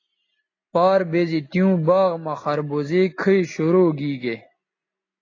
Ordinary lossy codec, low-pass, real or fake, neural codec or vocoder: AAC, 32 kbps; 7.2 kHz; real; none